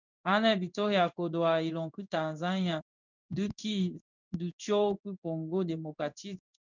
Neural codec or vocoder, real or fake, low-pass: codec, 16 kHz in and 24 kHz out, 1 kbps, XY-Tokenizer; fake; 7.2 kHz